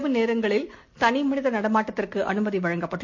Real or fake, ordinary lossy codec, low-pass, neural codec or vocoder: real; MP3, 48 kbps; 7.2 kHz; none